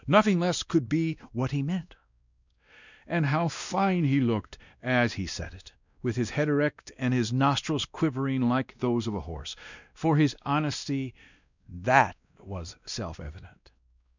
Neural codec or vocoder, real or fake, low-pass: codec, 16 kHz, 1 kbps, X-Codec, WavLM features, trained on Multilingual LibriSpeech; fake; 7.2 kHz